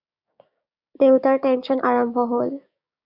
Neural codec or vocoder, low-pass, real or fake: codec, 16 kHz, 6 kbps, DAC; 5.4 kHz; fake